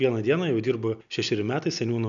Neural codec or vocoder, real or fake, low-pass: none; real; 7.2 kHz